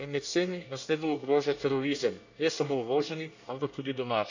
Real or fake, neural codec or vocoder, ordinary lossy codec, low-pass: fake; codec, 24 kHz, 1 kbps, SNAC; none; 7.2 kHz